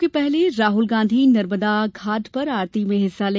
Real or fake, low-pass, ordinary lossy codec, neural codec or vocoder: real; none; none; none